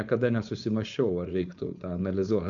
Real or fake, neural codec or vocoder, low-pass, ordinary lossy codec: fake; codec, 16 kHz, 4.8 kbps, FACodec; 7.2 kHz; MP3, 96 kbps